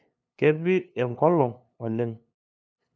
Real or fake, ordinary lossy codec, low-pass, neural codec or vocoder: fake; none; none; codec, 16 kHz, 2 kbps, FunCodec, trained on LibriTTS, 25 frames a second